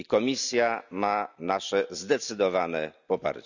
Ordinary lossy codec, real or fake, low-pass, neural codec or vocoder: none; real; 7.2 kHz; none